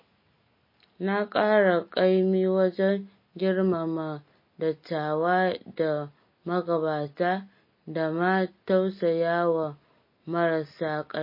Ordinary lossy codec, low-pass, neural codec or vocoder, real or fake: MP3, 24 kbps; 5.4 kHz; none; real